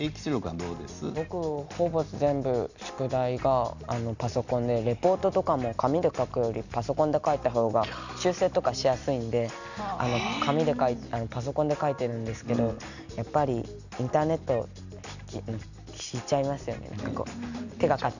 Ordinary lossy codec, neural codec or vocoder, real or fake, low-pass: none; none; real; 7.2 kHz